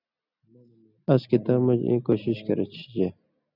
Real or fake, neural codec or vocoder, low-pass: real; none; 5.4 kHz